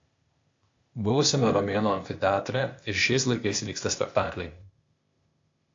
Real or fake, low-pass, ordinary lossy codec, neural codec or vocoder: fake; 7.2 kHz; AAC, 48 kbps; codec, 16 kHz, 0.8 kbps, ZipCodec